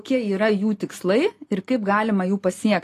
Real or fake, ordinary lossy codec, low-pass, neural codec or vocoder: real; AAC, 48 kbps; 14.4 kHz; none